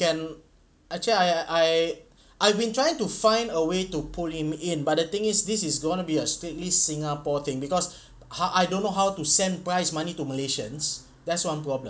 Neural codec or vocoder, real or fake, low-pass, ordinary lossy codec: none; real; none; none